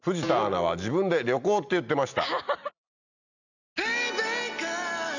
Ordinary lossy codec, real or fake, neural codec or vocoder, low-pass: none; real; none; 7.2 kHz